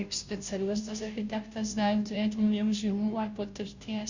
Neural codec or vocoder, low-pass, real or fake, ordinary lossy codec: codec, 16 kHz, 0.5 kbps, FunCodec, trained on Chinese and English, 25 frames a second; 7.2 kHz; fake; Opus, 64 kbps